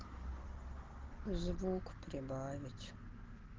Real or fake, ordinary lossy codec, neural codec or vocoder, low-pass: real; Opus, 16 kbps; none; 7.2 kHz